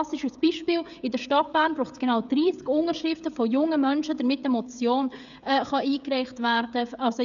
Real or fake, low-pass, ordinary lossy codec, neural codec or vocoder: fake; 7.2 kHz; none; codec, 16 kHz, 16 kbps, FreqCodec, smaller model